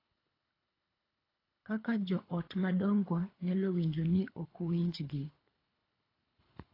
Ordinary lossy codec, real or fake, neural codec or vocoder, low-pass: AAC, 24 kbps; fake; codec, 24 kHz, 3 kbps, HILCodec; 5.4 kHz